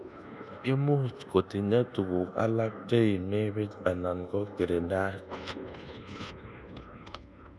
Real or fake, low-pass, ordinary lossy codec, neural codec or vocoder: fake; none; none; codec, 24 kHz, 1.2 kbps, DualCodec